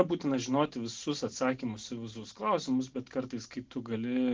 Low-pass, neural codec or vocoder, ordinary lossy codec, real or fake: 7.2 kHz; none; Opus, 16 kbps; real